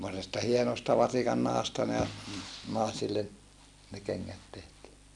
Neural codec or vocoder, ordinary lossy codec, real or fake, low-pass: none; none; real; none